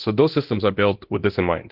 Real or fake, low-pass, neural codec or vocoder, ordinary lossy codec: fake; 5.4 kHz; codec, 24 kHz, 0.9 kbps, DualCodec; Opus, 16 kbps